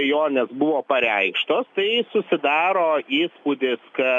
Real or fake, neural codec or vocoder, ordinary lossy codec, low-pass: real; none; MP3, 96 kbps; 10.8 kHz